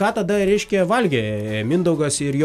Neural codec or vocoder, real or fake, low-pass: none; real; 14.4 kHz